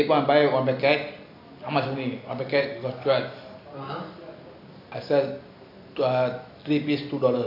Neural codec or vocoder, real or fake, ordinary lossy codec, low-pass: none; real; AAC, 32 kbps; 5.4 kHz